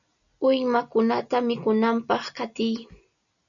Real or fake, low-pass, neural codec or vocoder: real; 7.2 kHz; none